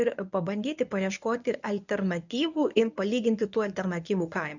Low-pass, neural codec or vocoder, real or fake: 7.2 kHz; codec, 24 kHz, 0.9 kbps, WavTokenizer, medium speech release version 1; fake